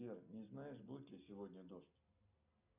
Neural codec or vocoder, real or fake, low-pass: none; real; 3.6 kHz